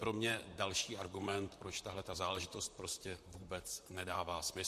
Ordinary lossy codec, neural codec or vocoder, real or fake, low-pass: MP3, 64 kbps; vocoder, 44.1 kHz, 128 mel bands, Pupu-Vocoder; fake; 14.4 kHz